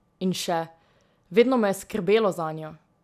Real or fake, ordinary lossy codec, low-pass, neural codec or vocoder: real; none; 14.4 kHz; none